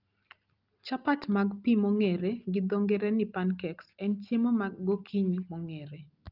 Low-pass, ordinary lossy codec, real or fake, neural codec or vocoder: 5.4 kHz; Opus, 24 kbps; real; none